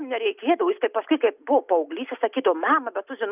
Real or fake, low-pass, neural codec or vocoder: real; 3.6 kHz; none